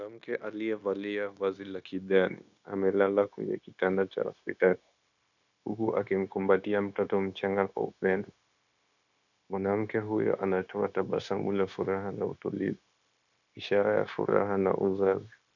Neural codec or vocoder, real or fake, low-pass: codec, 16 kHz, 0.9 kbps, LongCat-Audio-Codec; fake; 7.2 kHz